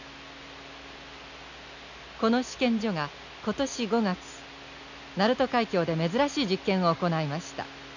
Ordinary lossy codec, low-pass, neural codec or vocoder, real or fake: none; 7.2 kHz; none; real